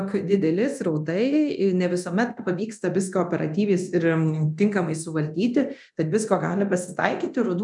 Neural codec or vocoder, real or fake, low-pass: codec, 24 kHz, 0.9 kbps, DualCodec; fake; 10.8 kHz